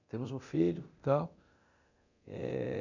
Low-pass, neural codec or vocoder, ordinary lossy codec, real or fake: 7.2 kHz; codec, 24 kHz, 0.9 kbps, DualCodec; none; fake